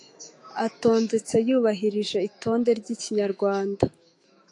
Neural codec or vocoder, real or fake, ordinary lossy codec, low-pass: autoencoder, 48 kHz, 128 numbers a frame, DAC-VAE, trained on Japanese speech; fake; AAC, 48 kbps; 10.8 kHz